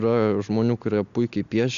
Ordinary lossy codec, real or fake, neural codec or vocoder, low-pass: Opus, 64 kbps; real; none; 7.2 kHz